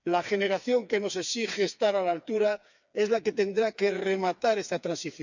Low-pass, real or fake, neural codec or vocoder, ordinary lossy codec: 7.2 kHz; fake; codec, 16 kHz, 4 kbps, FreqCodec, smaller model; none